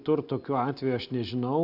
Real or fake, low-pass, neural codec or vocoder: real; 5.4 kHz; none